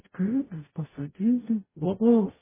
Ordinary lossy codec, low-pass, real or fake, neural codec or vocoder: MP3, 16 kbps; 3.6 kHz; fake; codec, 44.1 kHz, 0.9 kbps, DAC